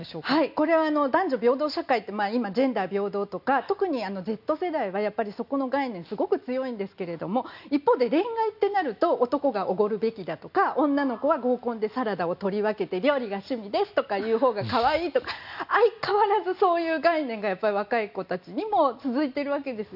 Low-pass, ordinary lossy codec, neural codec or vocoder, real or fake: 5.4 kHz; none; none; real